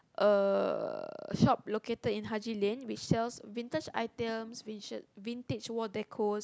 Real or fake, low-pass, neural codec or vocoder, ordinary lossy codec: real; none; none; none